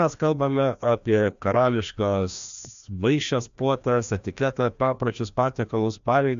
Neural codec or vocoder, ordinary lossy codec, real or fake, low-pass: codec, 16 kHz, 1 kbps, FreqCodec, larger model; MP3, 64 kbps; fake; 7.2 kHz